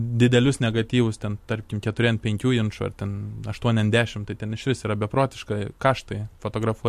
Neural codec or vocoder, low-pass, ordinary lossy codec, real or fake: vocoder, 44.1 kHz, 128 mel bands every 256 samples, BigVGAN v2; 14.4 kHz; MP3, 64 kbps; fake